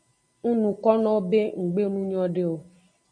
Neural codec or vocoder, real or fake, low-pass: none; real; 9.9 kHz